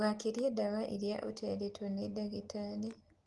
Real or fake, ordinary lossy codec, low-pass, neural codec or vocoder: real; Opus, 24 kbps; 10.8 kHz; none